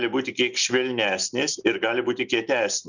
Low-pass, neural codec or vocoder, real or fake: 7.2 kHz; none; real